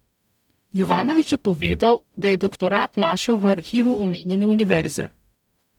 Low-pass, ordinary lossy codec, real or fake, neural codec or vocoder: 19.8 kHz; none; fake; codec, 44.1 kHz, 0.9 kbps, DAC